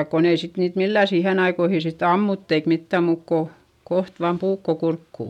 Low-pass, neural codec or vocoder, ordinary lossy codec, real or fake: 19.8 kHz; none; none; real